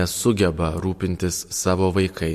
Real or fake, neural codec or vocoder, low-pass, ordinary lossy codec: real; none; 14.4 kHz; MP3, 64 kbps